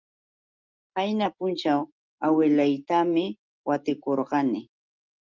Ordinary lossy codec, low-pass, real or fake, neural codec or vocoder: Opus, 32 kbps; 7.2 kHz; real; none